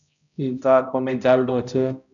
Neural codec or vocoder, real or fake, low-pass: codec, 16 kHz, 0.5 kbps, X-Codec, HuBERT features, trained on balanced general audio; fake; 7.2 kHz